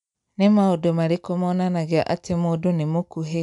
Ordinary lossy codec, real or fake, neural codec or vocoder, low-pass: none; real; none; 10.8 kHz